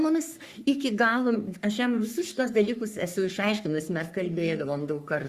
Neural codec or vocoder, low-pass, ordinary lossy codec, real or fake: codec, 44.1 kHz, 3.4 kbps, Pupu-Codec; 14.4 kHz; Opus, 64 kbps; fake